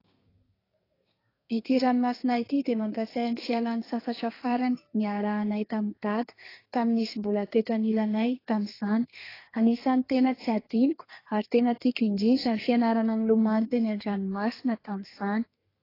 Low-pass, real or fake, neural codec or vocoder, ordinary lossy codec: 5.4 kHz; fake; codec, 32 kHz, 1.9 kbps, SNAC; AAC, 24 kbps